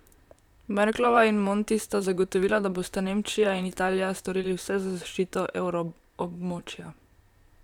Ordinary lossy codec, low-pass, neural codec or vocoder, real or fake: none; 19.8 kHz; vocoder, 44.1 kHz, 128 mel bands, Pupu-Vocoder; fake